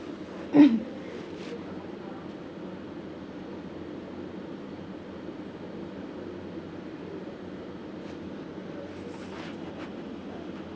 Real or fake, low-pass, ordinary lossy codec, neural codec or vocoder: real; none; none; none